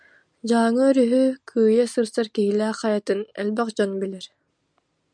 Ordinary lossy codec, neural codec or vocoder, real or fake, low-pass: MP3, 96 kbps; none; real; 9.9 kHz